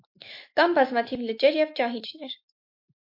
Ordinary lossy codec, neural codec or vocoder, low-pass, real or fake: MP3, 32 kbps; autoencoder, 48 kHz, 128 numbers a frame, DAC-VAE, trained on Japanese speech; 5.4 kHz; fake